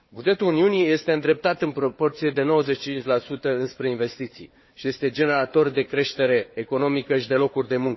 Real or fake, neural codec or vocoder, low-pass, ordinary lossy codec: fake; codec, 16 kHz, 4 kbps, FunCodec, trained on Chinese and English, 50 frames a second; 7.2 kHz; MP3, 24 kbps